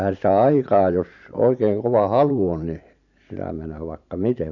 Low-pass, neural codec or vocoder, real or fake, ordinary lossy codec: 7.2 kHz; vocoder, 44.1 kHz, 128 mel bands every 512 samples, BigVGAN v2; fake; none